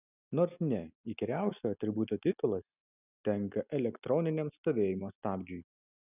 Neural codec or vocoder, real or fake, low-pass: none; real; 3.6 kHz